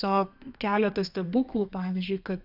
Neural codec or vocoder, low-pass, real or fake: codec, 32 kHz, 1.9 kbps, SNAC; 5.4 kHz; fake